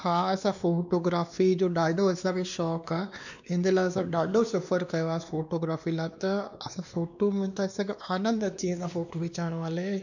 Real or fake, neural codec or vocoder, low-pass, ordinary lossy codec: fake; codec, 16 kHz, 2 kbps, X-Codec, WavLM features, trained on Multilingual LibriSpeech; 7.2 kHz; none